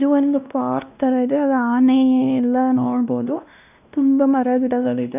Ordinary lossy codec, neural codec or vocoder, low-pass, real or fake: none; codec, 16 kHz, 1 kbps, X-Codec, WavLM features, trained on Multilingual LibriSpeech; 3.6 kHz; fake